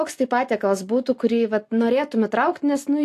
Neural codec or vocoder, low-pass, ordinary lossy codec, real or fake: none; 14.4 kHz; AAC, 64 kbps; real